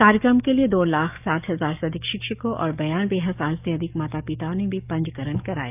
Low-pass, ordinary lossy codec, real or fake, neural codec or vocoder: 3.6 kHz; none; fake; codec, 44.1 kHz, 7.8 kbps, DAC